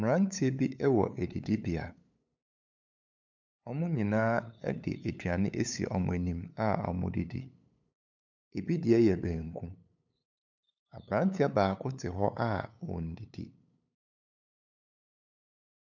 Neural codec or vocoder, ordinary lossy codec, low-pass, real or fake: codec, 16 kHz, 8 kbps, FunCodec, trained on LibriTTS, 25 frames a second; MP3, 64 kbps; 7.2 kHz; fake